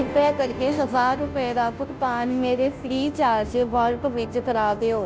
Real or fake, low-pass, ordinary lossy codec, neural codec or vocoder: fake; none; none; codec, 16 kHz, 0.5 kbps, FunCodec, trained on Chinese and English, 25 frames a second